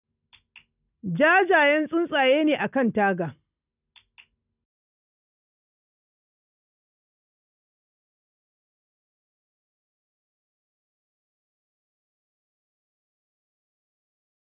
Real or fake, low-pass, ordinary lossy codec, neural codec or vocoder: real; 3.6 kHz; none; none